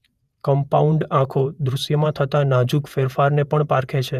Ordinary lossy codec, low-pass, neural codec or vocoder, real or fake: none; 14.4 kHz; vocoder, 48 kHz, 128 mel bands, Vocos; fake